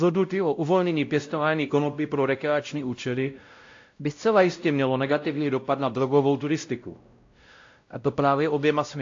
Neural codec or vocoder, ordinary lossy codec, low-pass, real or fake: codec, 16 kHz, 0.5 kbps, X-Codec, WavLM features, trained on Multilingual LibriSpeech; AAC, 48 kbps; 7.2 kHz; fake